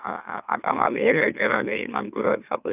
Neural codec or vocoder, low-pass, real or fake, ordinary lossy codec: autoencoder, 44.1 kHz, a latent of 192 numbers a frame, MeloTTS; 3.6 kHz; fake; none